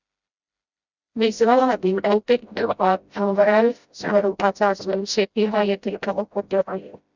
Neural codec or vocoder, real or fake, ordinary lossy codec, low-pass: codec, 16 kHz, 0.5 kbps, FreqCodec, smaller model; fake; Opus, 64 kbps; 7.2 kHz